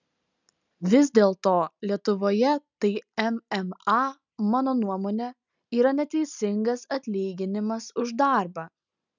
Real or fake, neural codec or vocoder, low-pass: real; none; 7.2 kHz